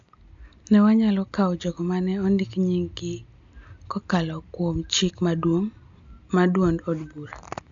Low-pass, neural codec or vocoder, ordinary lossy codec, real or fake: 7.2 kHz; none; AAC, 64 kbps; real